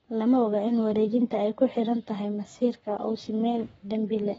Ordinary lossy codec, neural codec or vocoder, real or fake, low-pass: AAC, 24 kbps; codec, 44.1 kHz, 7.8 kbps, Pupu-Codec; fake; 19.8 kHz